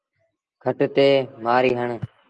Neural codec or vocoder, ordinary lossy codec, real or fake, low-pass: none; Opus, 24 kbps; real; 10.8 kHz